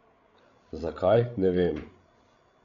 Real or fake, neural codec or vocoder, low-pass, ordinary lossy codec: fake; codec, 16 kHz, 16 kbps, FreqCodec, larger model; 7.2 kHz; none